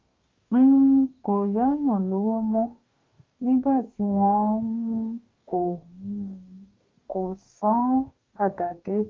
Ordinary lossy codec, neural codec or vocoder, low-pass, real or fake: Opus, 32 kbps; codec, 44.1 kHz, 2.6 kbps, DAC; 7.2 kHz; fake